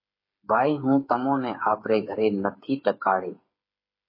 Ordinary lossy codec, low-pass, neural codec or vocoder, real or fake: MP3, 24 kbps; 5.4 kHz; codec, 16 kHz, 8 kbps, FreqCodec, smaller model; fake